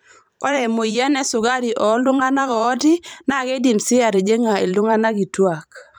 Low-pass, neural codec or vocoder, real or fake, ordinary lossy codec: none; vocoder, 44.1 kHz, 128 mel bands every 512 samples, BigVGAN v2; fake; none